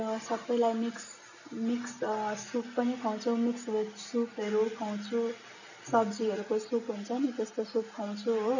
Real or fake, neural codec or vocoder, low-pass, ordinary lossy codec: real; none; 7.2 kHz; none